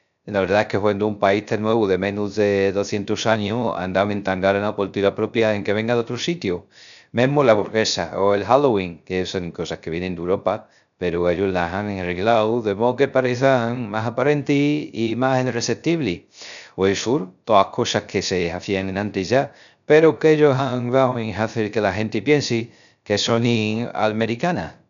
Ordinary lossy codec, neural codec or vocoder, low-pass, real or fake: none; codec, 16 kHz, 0.3 kbps, FocalCodec; 7.2 kHz; fake